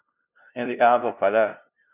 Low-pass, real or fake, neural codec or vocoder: 3.6 kHz; fake; codec, 16 kHz, 0.5 kbps, FunCodec, trained on LibriTTS, 25 frames a second